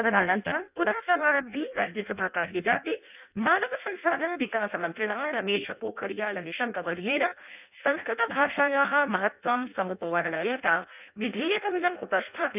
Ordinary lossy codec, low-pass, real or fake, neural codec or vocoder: none; 3.6 kHz; fake; codec, 16 kHz in and 24 kHz out, 0.6 kbps, FireRedTTS-2 codec